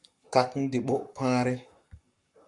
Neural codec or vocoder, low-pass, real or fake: codec, 44.1 kHz, 7.8 kbps, Pupu-Codec; 10.8 kHz; fake